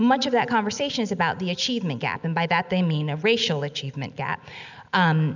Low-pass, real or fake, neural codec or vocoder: 7.2 kHz; real; none